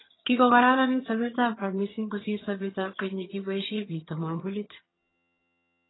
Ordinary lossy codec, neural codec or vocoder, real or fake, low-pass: AAC, 16 kbps; vocoder, 22.05 kHz, 80 mel bands, HiFi-GAN; fake; 7.2 kHz